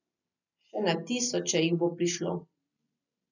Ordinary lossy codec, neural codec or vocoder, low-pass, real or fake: none; none; 7.2 kHz; real